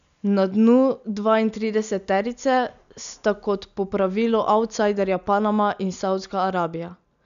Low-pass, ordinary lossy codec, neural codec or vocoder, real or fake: 7.2 kHz; none; none; real